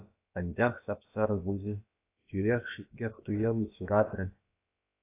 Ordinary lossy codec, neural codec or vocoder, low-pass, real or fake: AAC, 24 kbps; codec, 16 kHz, about 1 kbps, DyCAST, with the encoder's durations; 3.6 kHz; fake